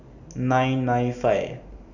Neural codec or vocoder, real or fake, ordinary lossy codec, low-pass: none; real; none; 7.2 kHz